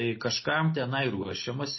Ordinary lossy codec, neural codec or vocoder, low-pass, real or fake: MP3, 24 kbps; none; 7.2 kHz; real